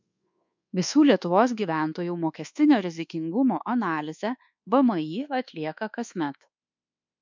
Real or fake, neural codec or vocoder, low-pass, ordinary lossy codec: fake; codec, 24 kHz, 1.2 kbps, DualCodec; 7.2 kHz; MP3, 64 kbps